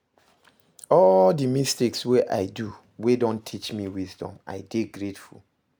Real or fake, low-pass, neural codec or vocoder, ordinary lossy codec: real; none; none; none